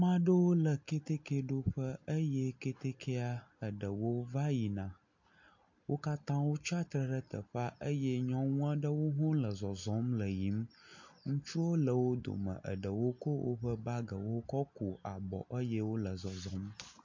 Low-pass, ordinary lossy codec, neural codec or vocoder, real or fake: 7.2 kHz; MP3, 48 kbps; none; real